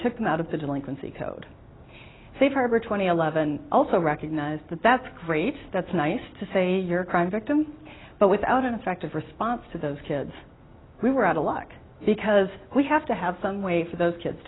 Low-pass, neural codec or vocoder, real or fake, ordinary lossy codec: 7.2 kHz; none; real; AAC, 16 kbps